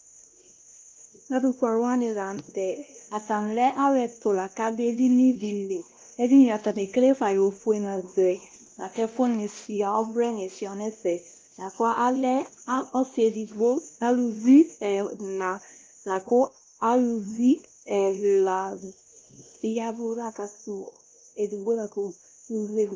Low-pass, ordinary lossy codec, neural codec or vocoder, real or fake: 7.2 kHz; Opus, 32 kbps; codec, 16 kHz, 1 kbps, X-Codec, WavLM features, trained on Multilingual LibriSpeech; fake